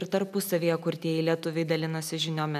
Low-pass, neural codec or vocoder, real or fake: 14.4 kHz; none; real